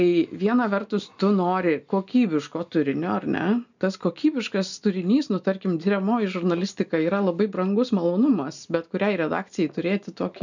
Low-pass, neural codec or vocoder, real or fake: 7.2 kHz; none; real